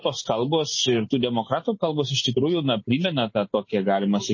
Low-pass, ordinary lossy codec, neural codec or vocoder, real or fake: 7.2 kHz; MP3, 32 kbps; none; real